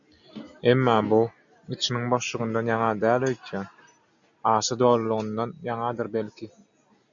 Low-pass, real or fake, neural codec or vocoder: 7.2 kHz; real; none